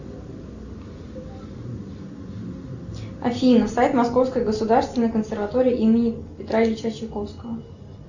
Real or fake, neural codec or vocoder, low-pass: real; none; 7.2 kHz